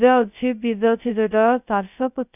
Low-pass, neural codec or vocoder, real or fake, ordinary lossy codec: 3.6 kHz; codec, 16 kHz, 0.2 kbps, FocalCodec; fake; none